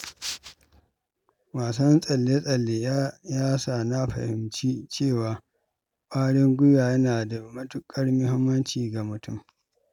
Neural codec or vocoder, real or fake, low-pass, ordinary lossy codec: none; real; 19.8 kHz; none